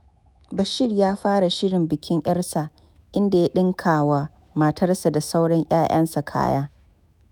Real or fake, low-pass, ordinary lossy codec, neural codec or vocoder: fake; none; none; autoencoder, 48 kHz, 128 numbers a frame, DAC-VAE, trained on Japanese speech